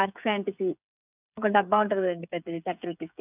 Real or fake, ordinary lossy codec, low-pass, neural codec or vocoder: fake; none; 3.6 kHz; codec, 16 kHz, 8 kbps, FreqCodec, smaller model